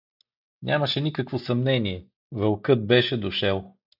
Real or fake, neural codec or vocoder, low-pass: real; none; 5.4 kHz